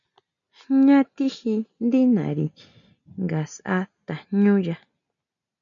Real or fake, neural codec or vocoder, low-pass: real; none; 7.2 kHz